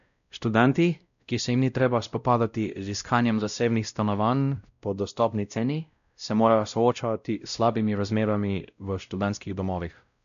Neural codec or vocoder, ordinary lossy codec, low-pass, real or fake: codec, 16 kHz, 0.5 kbps, X-Codec, WavLM features, trained on Multilingual LibriSpeech; none; 7.2 kHz; fake